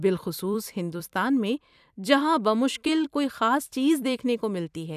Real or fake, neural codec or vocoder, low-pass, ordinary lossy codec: fake; vocoder, 44.1 kHz, 128 mel bands every 256 samples, BigVGAN v2; 14.4 kHz; none